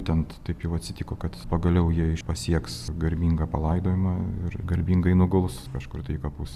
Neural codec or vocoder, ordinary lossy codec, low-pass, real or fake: none; Opus, 64 kbps; 14.4 kHz; real